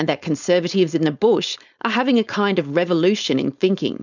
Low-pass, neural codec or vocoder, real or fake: 7.2 kHz; codec, 16 kHz, 4.8 kbps, FACodec; fake